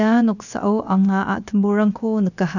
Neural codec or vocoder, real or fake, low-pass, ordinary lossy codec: codec, 16 kHz, 0.7 kbps, FocalCodec; fake; 7.2 kHz; none